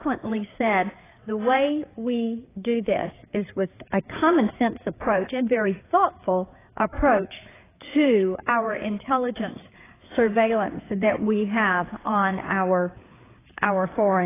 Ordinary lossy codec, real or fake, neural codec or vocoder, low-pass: AAC, 16 kbps; fake; codec, 16 kHz, 4 kbps, FreqCodec, larger model; 3.6 kHz